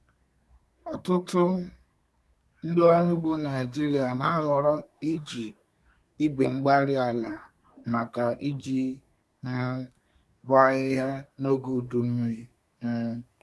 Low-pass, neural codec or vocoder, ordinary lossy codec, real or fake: none; codec, 24 kHz, 1 kbps, SNAC; none; fake